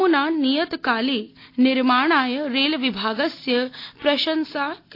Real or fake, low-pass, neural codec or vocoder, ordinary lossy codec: real; 5.4 kHz; none; AAC, 32 kbps